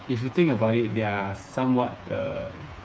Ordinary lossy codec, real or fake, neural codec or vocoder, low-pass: none; fake; codec, 16 kHz, 4 kbps, FreqCodec, smaller model; none